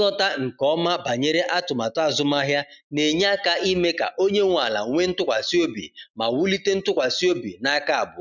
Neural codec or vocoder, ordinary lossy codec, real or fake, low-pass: none; none; real; 7.2 kHz